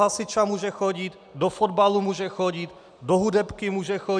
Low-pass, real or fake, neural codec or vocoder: 9.9 kHz; real; none